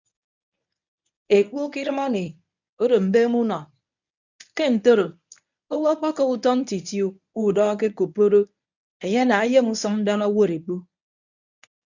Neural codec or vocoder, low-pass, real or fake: codec, 24 kHz, 0.9 kbps, WavTokenizer, medium speech release version 1; 7.2 kHz; fake